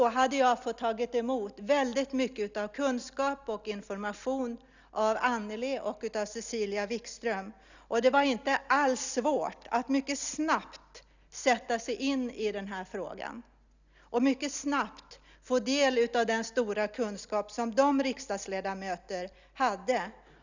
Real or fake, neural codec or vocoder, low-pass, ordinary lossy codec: real; none; 7.2 kHz; none